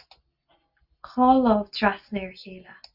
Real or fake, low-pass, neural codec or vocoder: real; 5.4 kHz; none